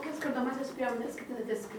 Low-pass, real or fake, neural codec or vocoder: 19.8 kHz; real; none